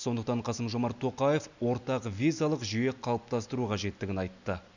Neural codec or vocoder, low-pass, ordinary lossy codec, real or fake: none; 7.2 kHz; none; real